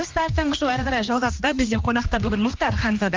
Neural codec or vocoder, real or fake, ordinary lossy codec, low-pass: codec, 16 kHz, 2 kbps, X-Codec, HuBERT features, trained on general audio; fake; Opus, 32 kbps; 7.2 kHz